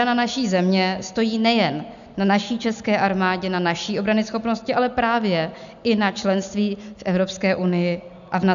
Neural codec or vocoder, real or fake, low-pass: none; real; 7.2 kHz